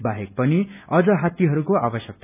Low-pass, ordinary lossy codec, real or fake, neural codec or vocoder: 3.6 kHz; none; real; none